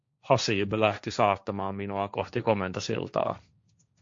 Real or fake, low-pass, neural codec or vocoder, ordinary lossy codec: fake; 7.2 kHz; codec, 16 kHz, 1.1 kbps, Voila-Tokenizer; MP3, 48 kbps